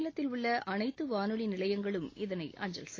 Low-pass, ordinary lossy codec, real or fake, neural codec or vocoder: 7.2 kHz; AAC, 32 kbps; real; none